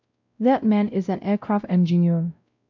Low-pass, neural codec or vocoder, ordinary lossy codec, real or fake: 7.2 kHz; codec, 16 kHz, 0.5 kbps, X-Codec, WavLM features, trained on Multilingual LibriSpeech; MP3, 64 kbps; fake